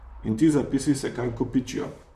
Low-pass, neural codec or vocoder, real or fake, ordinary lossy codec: 14.4 kHz; vocoder, 44.1 kHz, 128 mel bands, Pupu-Vocoder; fake; none